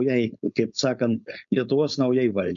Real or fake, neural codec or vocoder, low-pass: fake; codec, 16 kHz, 4.8 kbps, FACodec; 7.2 kHz